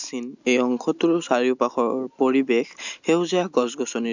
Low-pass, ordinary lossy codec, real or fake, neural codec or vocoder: 7.2 kHz; none; real; none